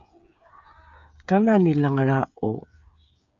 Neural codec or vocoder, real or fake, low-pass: codec, 16 kHz, 8 kbps, FreqCodec, smaller model; fake; 7.2 kHz